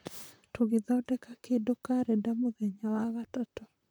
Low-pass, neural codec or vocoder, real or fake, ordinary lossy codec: none; vocoder, 44.1 kHz, 128 mel bands, Pupu-Vocoder; fake; none